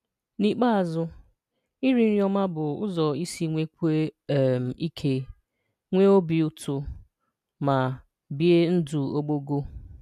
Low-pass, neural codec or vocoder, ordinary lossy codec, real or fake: 14.4 kHz; none; none; real